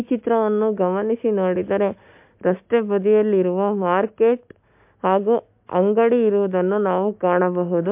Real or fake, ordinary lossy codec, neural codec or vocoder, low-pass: real; MP3, 32 kbps; none; 3.6 kHz